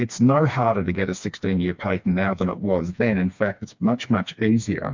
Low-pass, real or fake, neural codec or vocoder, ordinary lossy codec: 7.2 kHz; fake; codec, 16 kHz, 2 kbps, FreqCodec, smaller model; MP3, 64 kbps